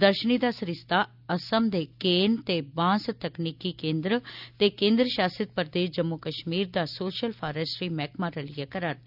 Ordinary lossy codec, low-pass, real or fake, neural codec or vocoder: none; 5.4 kHz; real; none